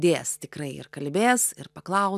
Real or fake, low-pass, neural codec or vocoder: real; 14.4 kHz; none